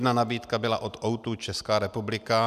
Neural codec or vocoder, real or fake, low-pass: none; real; 14.4 kHz